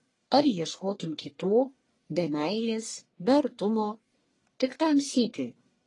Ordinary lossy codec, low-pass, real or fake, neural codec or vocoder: AAC, 32 kbps; 10.8 kHz; fake; codec, 44.1 kHz, 1.7 kbps, Pupu-Codec